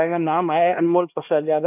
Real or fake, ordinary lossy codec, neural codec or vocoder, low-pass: fake; AAC, 32 kbps; codec, 16 kHz, 1 kbps, X-Codec, HuBERT features, trained on LibriSpeech; 3.6 kHz